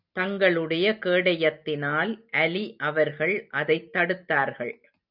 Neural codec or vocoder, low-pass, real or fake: none; 5.4 kHz; real